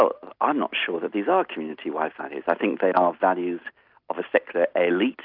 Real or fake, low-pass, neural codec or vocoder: real; 5.4 kHz; none